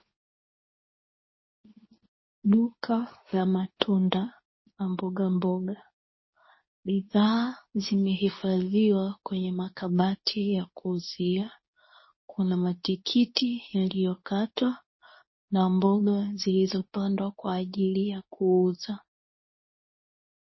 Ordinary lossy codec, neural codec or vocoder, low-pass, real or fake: MP3, 24 kbps; codec, 24 kHz, 0.9 kbps, WavTokenizer, medium speech release version 2; 7.2 kHz; fake